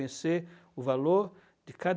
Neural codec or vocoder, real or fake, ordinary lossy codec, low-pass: none; real; none; none